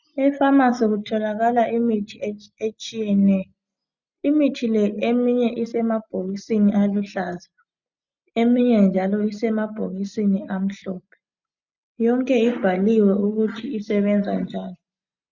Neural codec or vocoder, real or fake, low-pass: none; real; 7.2 kHz